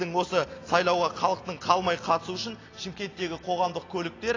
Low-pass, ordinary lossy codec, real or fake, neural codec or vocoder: 7.2 kHz; AAC, 32 kbps; real; none